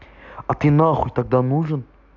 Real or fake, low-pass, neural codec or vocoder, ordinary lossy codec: real; 7.2 kHz; none; AAC, 48 kbps